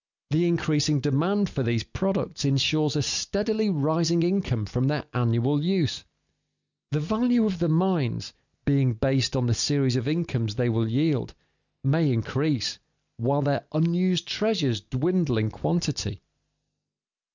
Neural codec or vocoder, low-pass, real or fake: none; 7.2 kHz; real